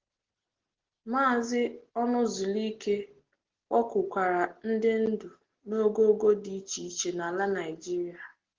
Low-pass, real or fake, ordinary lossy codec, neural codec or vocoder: 7.2 kHz; real; Opus, 16 kbps; none